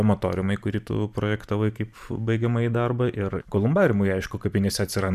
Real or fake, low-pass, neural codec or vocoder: fake; 14.4 kHz; vocoder, 44.1 kHz, 128 mel bands every 512 samples, BigVGAN v2